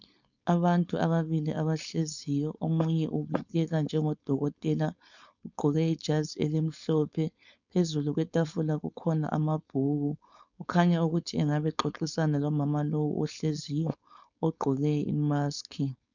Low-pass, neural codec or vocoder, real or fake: 7.2 kHz; codec, 16 kHz, 4.8 kbps, FACodec; fake